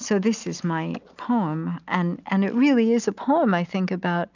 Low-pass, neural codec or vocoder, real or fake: 7.2 kHz; none; real